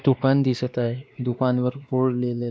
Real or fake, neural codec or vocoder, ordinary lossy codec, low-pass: fake; codec, 16 kHz, 2 kbps, X-Codec, WavLM features, trained on Multilingual LibriSpeech; none; none